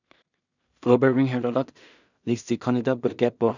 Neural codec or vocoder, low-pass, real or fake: codec, 16 kHz in and 24 kHz out, 0.4 kbps, LongCat-Audio-Codec, two codebook decoder; 7.2 kHz; fake